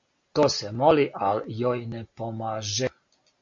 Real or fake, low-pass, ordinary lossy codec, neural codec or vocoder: real; 7.2 kHz; MP3, 48 kbps; none